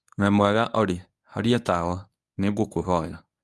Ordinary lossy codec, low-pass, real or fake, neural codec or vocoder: none; none; fake; codec, 24 kHz, 0.9 kbps, WavTokenizer, medium speech release version 1